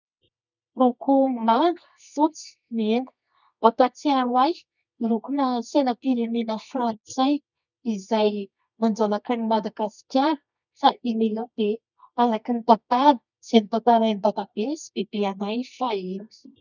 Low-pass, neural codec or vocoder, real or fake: 7.2 kHz; codec, 24 kHz, 0.9 kbps, WavTokenizer, medium music audio release; fake